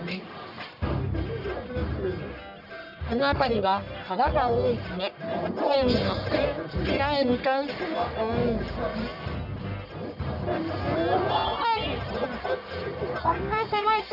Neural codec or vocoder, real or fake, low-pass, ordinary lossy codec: codec, 44.1 kHz, 1.7 kbps, Pupu-Codec; fake; 5.4 kHz; none